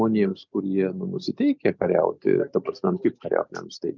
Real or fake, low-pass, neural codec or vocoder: real; 7.2 kHz; none